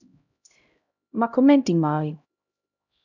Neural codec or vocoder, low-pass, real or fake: codec, 16 kHz, 0.5 kbps, X-Codec, HuBERT features, trained on LibriSpeech; 7.2 kHz; fake